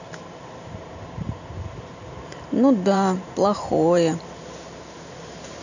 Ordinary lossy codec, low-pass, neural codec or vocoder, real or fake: none; 7.2 kHz; vocoder, 44.1 kHz, 128 mel bands every 512 samples, BigVGAN v2; fake